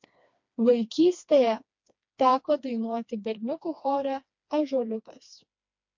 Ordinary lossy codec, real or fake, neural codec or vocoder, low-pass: MP3, 48 kbps; fake; codec, 16 kHz, 2 kbps, FreqCodec, smaller model; 7.2 kHz